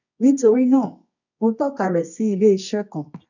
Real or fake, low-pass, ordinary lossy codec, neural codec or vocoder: fake; 7.2 kHz; none; codec, 24 kHz, 0.9 kbps, WavTokenizer, medium music audio release